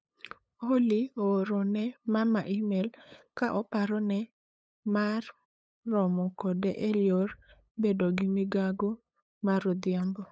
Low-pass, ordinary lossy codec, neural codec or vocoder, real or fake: none; none; codec, 16 kHz, 8 kbps, FunCodec, trained on LibriTTS, 25 frames a second; fake